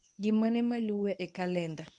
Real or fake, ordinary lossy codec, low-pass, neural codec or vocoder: fake; none; 10.8 kHz; codec, 24 kHz, 0.9 kbps, WavTokenizer, medium speech release version 1